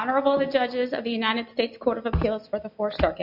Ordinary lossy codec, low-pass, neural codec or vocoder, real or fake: AAC, 48 kbps; 5.4 kHz; none; real